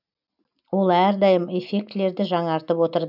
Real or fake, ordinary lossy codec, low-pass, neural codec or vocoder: real; none; 5.4 kHz; none